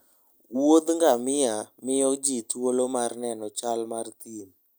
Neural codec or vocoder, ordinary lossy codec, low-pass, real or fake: none; none; none; real